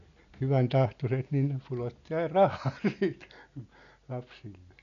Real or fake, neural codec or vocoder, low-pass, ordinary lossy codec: real; none; 7.2 kHz; none